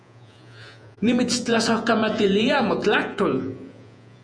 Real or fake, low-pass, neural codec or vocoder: fake; 9.9 kHz; vocoder, 48 kHz, 128 mel bands, Vocos